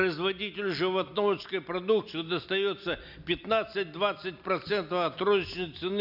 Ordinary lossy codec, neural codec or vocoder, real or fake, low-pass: none; none; real; 5.4 kHz